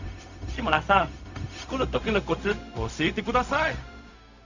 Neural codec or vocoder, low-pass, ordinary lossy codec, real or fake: codec, 16 kHz, 0.4 kbps, LongCat-Audio-Codec; 7.2 kHz; none; fake